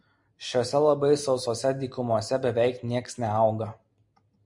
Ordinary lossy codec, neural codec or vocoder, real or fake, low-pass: MP3, 64 kbps; none; real; 10.8 kHz